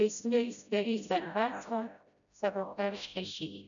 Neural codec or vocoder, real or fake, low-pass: codec, 16 kHz, 0.5 kbps, FreqCodec, smaller model; fake; 7.2 kHz